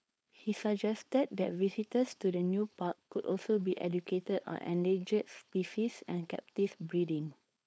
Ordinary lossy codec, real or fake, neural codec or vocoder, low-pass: none; fake; codec, 16 kHz, 4.8 kbps, FACodec; none